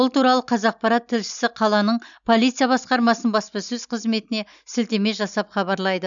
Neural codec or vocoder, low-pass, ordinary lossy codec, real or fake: none; 7.2 kHz; none; real